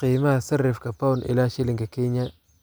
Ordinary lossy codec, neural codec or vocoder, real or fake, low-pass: none; none; real; none